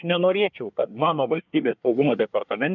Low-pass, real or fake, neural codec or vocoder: 7.2 kHz; fake; codec, 24 kHz, 1 kbps, SNAC